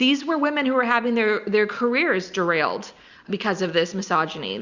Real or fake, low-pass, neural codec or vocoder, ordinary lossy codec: real; 7.2 kHz; none; Opus, 64 kbps